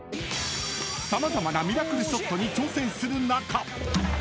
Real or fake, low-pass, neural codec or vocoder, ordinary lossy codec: real; none; none; none